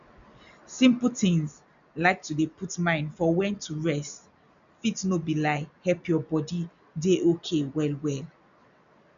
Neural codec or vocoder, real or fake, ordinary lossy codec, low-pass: none; real; none; 7.2 kHz